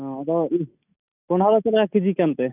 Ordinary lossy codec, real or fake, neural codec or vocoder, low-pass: none; real; none; 3.6 kHz